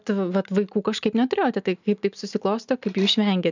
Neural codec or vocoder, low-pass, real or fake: none; 7.2 kHz; real